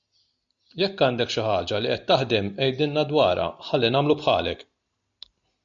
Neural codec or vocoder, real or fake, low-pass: none; real; 7.2 kHz